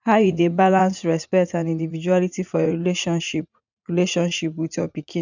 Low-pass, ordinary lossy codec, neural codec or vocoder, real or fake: 7.2 kHz; none; vocoder, 44.1 kHz, 80 mel bands, Vocos; fake